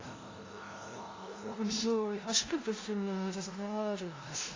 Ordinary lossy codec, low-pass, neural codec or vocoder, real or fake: AAC, 32 kbps; 7.2 kHz; codec, 16 kHz, 0.5 kbps, FunCodec, trained on LibriTTS, 25 frames a second; fake